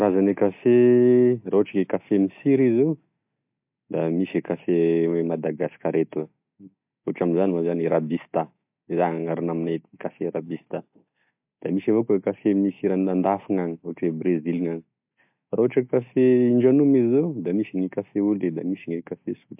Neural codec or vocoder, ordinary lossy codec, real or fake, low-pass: none; MP3, 32 kbps; real; 3.6 kHz